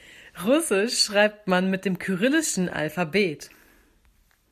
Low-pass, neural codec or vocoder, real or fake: 14.4 kHz; none; real